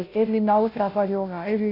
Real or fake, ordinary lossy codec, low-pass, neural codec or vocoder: fake; none; 5.4 kHz; codec, 16 kHz, 0.5 kbps, FunCodec, trained on Chinese and English, 25 frames a second